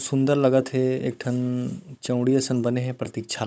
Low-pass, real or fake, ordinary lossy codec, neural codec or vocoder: none; fake; none; codec, 16 kHz, 16 kbps, FunCodec, trained on Chinese and English, 50 frames a second